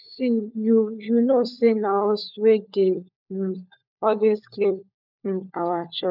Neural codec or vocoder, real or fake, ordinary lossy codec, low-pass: codec, 16 kHz, 4 kbps, FunCodec, trained on LibriTTS, 50 frames a second; fake; none; 5.4 kHz